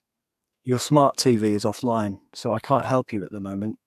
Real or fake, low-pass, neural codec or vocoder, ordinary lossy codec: fake; 14.4 kHz; codec, 32 kHz, 1.9 kbps, SNAC; none